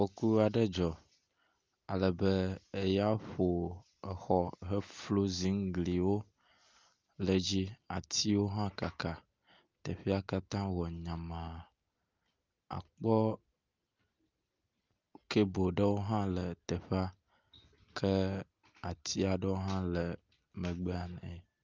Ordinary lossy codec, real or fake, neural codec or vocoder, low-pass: Opus, 24 kbps; real; none; 7.2 kHz